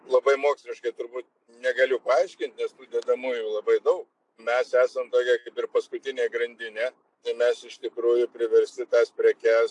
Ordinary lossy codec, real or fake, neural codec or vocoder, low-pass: AAC, 64 kbps; real; none; 10.8 kHz